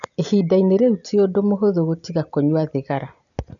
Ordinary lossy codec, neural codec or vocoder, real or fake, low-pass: none; none; real; 7.2 kHz